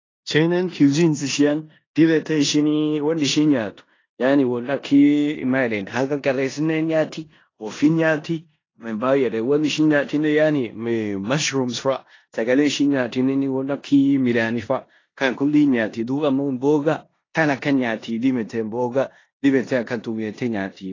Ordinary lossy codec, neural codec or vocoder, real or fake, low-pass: AAC, 32 kbps; codec, 16 kHz in and 24 kHz out, 0.9 kbps, LongCat-Audio-Codec, four codebook decoder; fake; 7.2 kHz